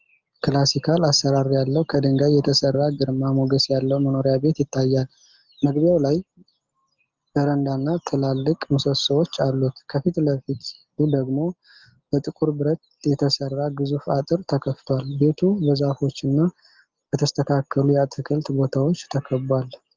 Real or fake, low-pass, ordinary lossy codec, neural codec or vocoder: real; 7.2 kHz; Opus, 24 kbps; none